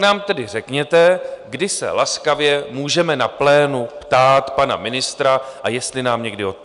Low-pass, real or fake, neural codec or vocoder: 10.8 kHz; real; none